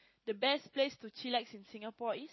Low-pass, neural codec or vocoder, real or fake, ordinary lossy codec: 7.2 kHz; none; real; MP3, 24 kbps